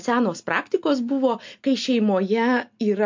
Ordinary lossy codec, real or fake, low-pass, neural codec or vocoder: MP3, 48 kbps; real; 7.2 kHz; none